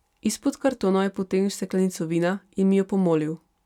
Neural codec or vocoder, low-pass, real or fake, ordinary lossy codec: none; 19.8 kHz; real; none